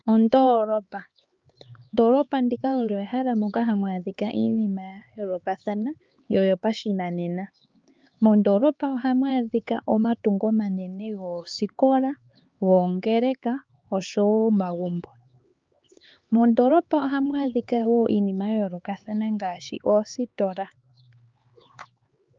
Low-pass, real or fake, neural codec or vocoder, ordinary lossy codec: 7.2 kHz; fake; codec, 16 kHz, 4 kbps, X-Codec, HuBERT features, trained on LibriSpeech; Opus, 24 kbps